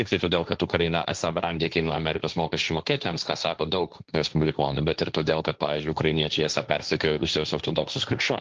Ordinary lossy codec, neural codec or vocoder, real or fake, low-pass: Opus, 24 kbps; codec, 16 kHz, 1.1 kbps, Voila-Tokenizer; fake; 7.2 kHz